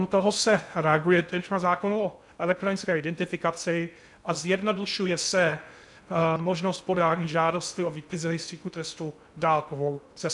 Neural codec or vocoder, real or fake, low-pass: codec, 16 kHz in and 24 kHz out, 0.6 kbps, FocalCodec, streaming, 2048 codes; fake; 10.8 kHz